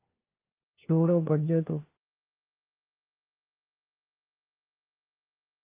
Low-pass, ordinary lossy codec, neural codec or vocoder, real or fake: 3.6 kHz; Opus, 32 kbps; codec, 16 kHz, 1 kbps, FunCodec, trained on LibriTTS, 50 frames a second; fake